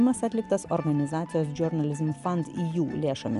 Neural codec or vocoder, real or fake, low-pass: none; real; 10.8 kHz